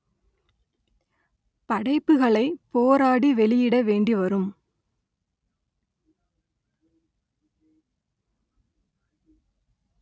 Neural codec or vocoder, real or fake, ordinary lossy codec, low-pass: none; real; none; none